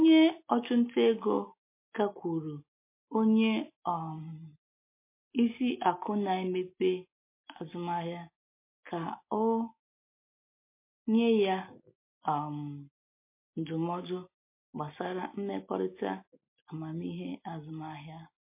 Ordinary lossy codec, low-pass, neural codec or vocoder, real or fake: MP3, 24 kbps; 3.6 kHz; none; real